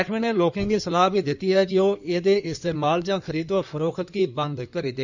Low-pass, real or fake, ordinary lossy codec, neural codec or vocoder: 7.2 kHz; fake; none; codec, 16 kHz in and 24 kHz out, 2.2 kbps, FireRedTTS-2 codec